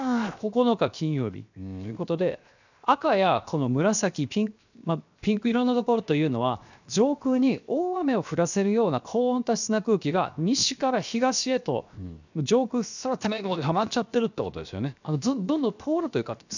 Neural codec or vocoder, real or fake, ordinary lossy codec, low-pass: codec, 16 kHz, 0.7 kbps, FocalCodec; fake; none; 7.2 kHz